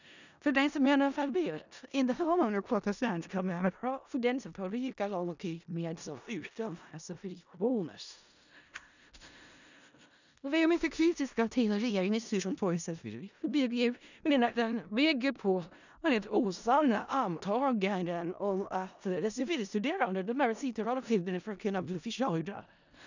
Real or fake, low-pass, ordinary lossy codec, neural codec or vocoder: fake; 7.2 kHz; none; codec, 16 kHz in and 24 kHz out, 0.4 kbps, LongCat-Audio-Codec, four codebook decoder